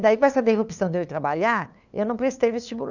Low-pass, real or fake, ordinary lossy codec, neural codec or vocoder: 7.2 kHz; fake; none; codec, 16 kHz, 2 kbps, FunCodec, trained on LibriTTS, 25 frames a second